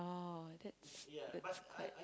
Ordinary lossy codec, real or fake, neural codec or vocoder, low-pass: none; real; none; none